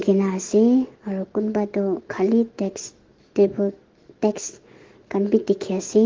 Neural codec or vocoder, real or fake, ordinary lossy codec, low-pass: autoencoder, 48 kHz, 128 numbers a frame, DAC-VAE, trained on Japanese speech; fake; Opus, 16 kbps; 7.2 kHz